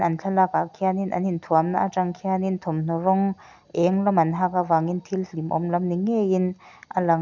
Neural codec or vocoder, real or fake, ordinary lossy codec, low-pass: none; real; none; 7.2 kHz